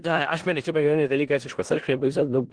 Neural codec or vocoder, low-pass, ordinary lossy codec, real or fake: codec, 16 kHz in and 24 kHz out, 0.4 kbps, LongCat-Audio-Codec, four codebook decoder; 9.9 kHz; Opus, 16 kbps; fake